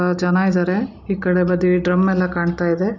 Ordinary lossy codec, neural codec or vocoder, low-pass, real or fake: none; none; 7.2 kHz; real